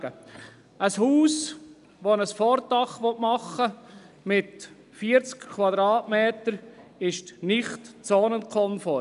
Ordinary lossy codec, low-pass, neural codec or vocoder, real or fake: none; 10.8 kHz; none; real